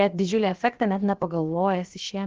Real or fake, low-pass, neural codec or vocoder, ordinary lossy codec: fake; 7.2 kHz; codec, 16 kHz, about 1 kbps, DyCAST, with the encoder's durations; Opus, 16 kbps